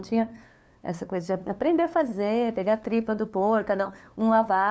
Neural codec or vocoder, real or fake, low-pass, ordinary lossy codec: codec, 16 kHz, 2 kbps, FunCodec, trained on LibriTTS, 25 frames a second; fake; none; none